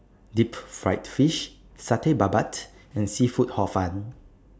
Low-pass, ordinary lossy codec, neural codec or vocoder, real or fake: none; none; none; real